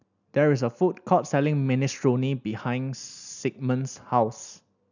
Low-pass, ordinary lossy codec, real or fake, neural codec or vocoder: 7.2 kHz; none; real; none